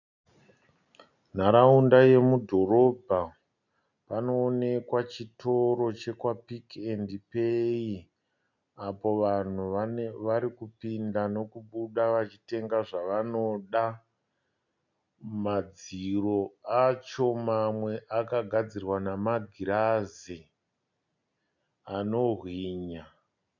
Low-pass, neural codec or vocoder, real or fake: 7.2 kHz; none; real